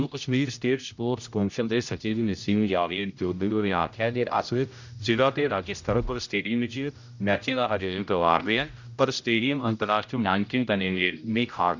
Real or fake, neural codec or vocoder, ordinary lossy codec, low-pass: fake; codec, 16 kHz, 0.5 kbps, X-Codec, HuBERT features, trained on general audio; none; 7.2 kHz